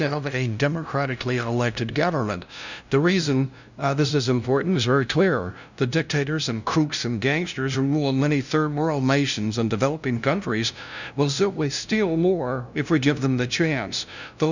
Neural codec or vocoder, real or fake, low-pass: codec, 16 kHz, 0.5 kbps, FunCodec, trained on LibriTTS, 25 frames a second; fake; 7.2 kHz